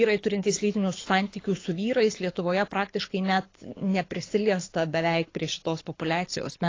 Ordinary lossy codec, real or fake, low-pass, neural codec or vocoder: AAC, 32 kbps; fake; 7.2 kHz; codec, 24 kHz, 6 kbps, HILCodec